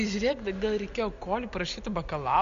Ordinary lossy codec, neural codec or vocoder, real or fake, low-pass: MP3, 64 kbps; none; real; 7.2 kHz